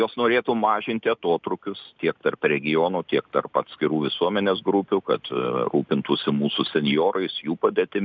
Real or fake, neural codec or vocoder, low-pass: real; none; 7.2 kHz